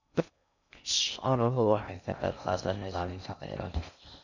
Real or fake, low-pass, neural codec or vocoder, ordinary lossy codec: fake; 7.2 kHz; codec, 16 kHz in and 24 kHz out, 0.6 kbps, FocalCodec, streaming, 2048 codes; none